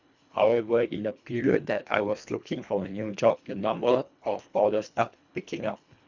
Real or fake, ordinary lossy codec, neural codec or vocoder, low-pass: fake; none; codec, 24 kHz, 1.5 kbps, HILCodec; 7.2 kHz